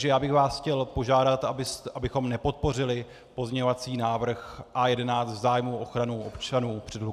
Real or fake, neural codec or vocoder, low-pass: real; none; 14.4 kHz